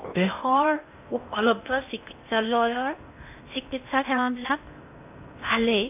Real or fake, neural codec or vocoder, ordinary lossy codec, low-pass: fake; codec, 16 kHz in and 24 kHz out, 0.6 kbps, FocalCodec, streaming, 2048 codes; AAC, 32 kbps; 3.6 kHz